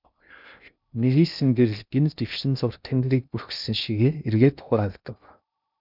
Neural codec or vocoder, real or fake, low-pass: codec, 16 kHz in and 24 kHz out, 0.6 kbps, FocalCodec, streaming, 2048 codes; fake; 5.4 kHz